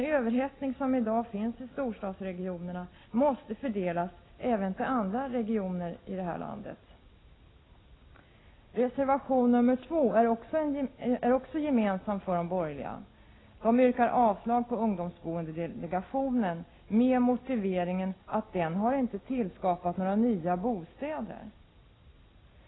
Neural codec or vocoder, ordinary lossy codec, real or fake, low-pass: none; AAC, 16 kbps; real; 7.2 kHz